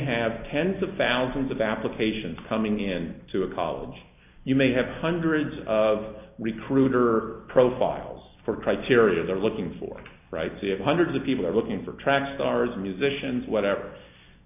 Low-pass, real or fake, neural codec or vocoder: 3.6 kHz; real; none